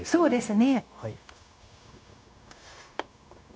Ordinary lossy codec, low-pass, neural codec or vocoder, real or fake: none; none; codec, 16 kHz, 0.9 kbps, LongCat-Audio-Codec; fake